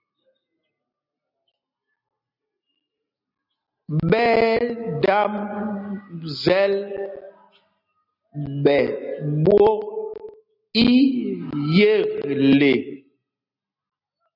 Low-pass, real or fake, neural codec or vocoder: 5.4 kHz; real; none